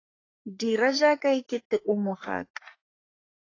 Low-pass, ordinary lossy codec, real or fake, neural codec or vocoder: 7.2 kHz; AAC, 32 kbps; fake; codec, 44.1 kHz, 3.4 kbps, Pupu-Codec